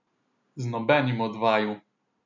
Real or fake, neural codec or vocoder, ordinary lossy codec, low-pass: real; none; none; 7.2 kHz